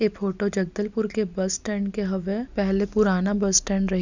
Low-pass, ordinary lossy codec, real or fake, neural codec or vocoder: 7.2 kHz; none; real; none